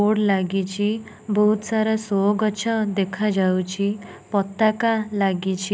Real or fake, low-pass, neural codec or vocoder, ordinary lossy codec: real; none; none; none